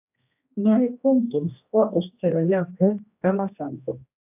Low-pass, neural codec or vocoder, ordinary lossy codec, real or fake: 3.6 kHz; codec, 16 kHz, 1 kbps, X-Codec, HuBERT features, trained on general audio; AAC, 32 kbps; fake